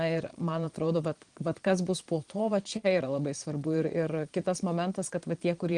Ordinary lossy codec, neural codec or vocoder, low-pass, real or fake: Opus, 24 kbps; vocoder, 22.05 kHz, 80 mel bands, Vocos; 9.9 kHz; fake